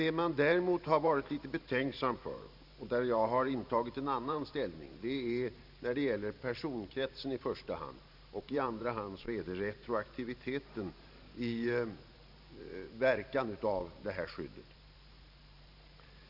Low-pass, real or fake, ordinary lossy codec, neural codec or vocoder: 5.4 kHz; fake; Opus, 64 kbps; vocoder, 44.1 kHz, 128 mel bands every 512 samples, BigVGAN v2